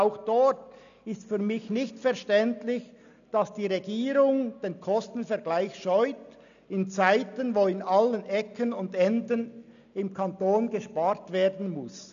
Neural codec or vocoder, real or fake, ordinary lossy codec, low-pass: none; real; none; 7.2 kHz